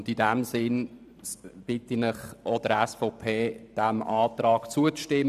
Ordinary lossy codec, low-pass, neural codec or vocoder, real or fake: none; 14.4 kHz; vocoder, 44.1 kHz, 128 mel bands every 512 samples, BigVGAN v2; fake